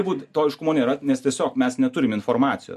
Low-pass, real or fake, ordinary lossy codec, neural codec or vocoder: 14.4 kHz; real; MP3, 64 kbps; none